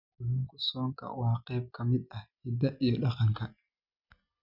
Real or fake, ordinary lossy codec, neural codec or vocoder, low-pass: real; none; none; 5.4 kHz